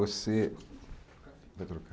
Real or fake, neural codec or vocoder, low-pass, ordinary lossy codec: real; none; none; none